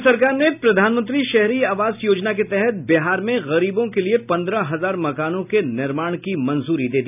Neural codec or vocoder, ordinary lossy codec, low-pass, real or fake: none; none; 3.6 kHz; real